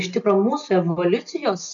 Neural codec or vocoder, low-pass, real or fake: none; 7.2 kHz; real